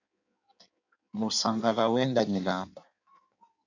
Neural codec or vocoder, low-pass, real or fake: codec, 16 kHz in and 24 kHz out, 1.1 kbps, FireRedTTS-2 codec; 7.2 kHz; fake